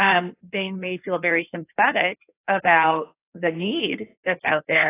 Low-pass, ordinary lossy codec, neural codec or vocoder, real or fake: 3.6 kHz; AAC, 24 kbps; codec, 16 kHz in and 24 kHz out, 1.1 kbps, FireRedTTS-2 codec; fake